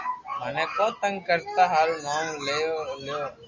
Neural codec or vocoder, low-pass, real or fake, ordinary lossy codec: none; 7.2 kHz; real; Opus, 64 kbps